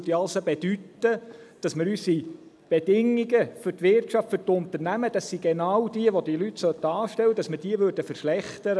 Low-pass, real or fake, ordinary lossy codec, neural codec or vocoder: none; real; none; none